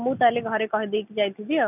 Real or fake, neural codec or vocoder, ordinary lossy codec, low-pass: real; none; none; 3.6 kHz